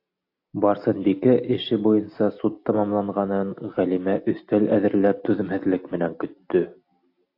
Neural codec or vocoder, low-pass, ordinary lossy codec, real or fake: none; 5.4 kHz; AAC, 32 kbps; real